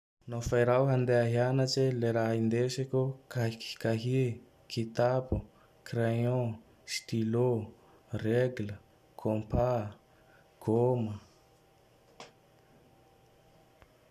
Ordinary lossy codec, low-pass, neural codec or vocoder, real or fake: none; 14.4 kHz; none; real